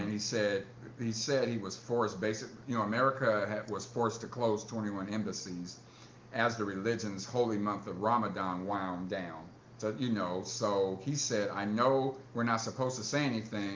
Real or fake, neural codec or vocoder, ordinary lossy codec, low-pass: real; none; Opus, 32 kbps; 7.2 kHz